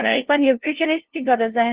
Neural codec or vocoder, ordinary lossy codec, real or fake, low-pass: codec, 16 kHz, 0.5 kbps, FunCodec, trained on LibriTTS, 25 frames a second; Opus, 16 kbps; fake; 3.6 kHz